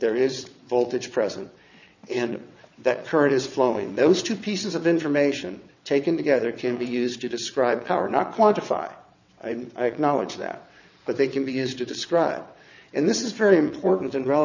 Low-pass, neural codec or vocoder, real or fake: 7.2 kHz; vocoder, 22.05 kHz, 80 mel bands, WaveNeXt; fake